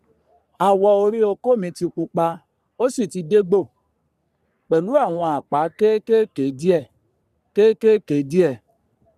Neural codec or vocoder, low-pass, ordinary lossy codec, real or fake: codec, 44.1 kHz, 3.4 kbps, Pupu-Codec; 14.4 kHz; none; fake